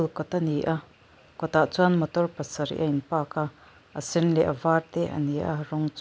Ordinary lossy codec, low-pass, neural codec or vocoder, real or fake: none; none; none; real